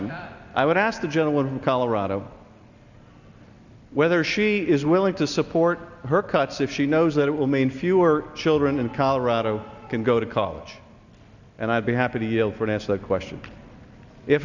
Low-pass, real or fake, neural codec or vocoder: 7.2 kHz; real; none